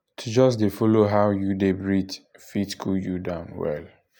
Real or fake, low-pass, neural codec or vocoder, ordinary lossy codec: real; none; none; none